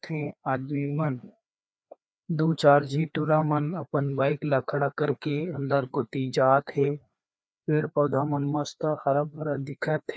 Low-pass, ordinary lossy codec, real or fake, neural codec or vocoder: none; none; fake; codec, 16 kHz, 2 kbps, FreqCodec, larger model